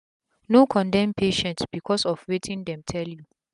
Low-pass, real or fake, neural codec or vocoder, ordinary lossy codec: 10.8 kHz; real; none; none